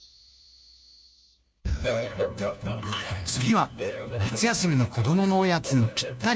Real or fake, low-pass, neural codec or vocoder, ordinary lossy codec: fake; none; codec, 16 kHz, 1 kbps, FunCodec, trained on LibriTTS, 50 frames a second; none